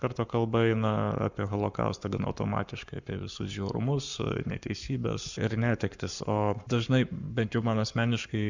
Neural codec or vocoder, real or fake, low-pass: codec, 44.1 kHz, 7.8 kbps, Pupu-Codec; fake; 7.2 kHz